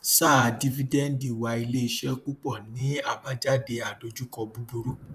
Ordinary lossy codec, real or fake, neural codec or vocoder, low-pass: none; fake; vocoder, 44.1 kHz, 128 mel bands, Pupu-Vocoder; 14.4 kHz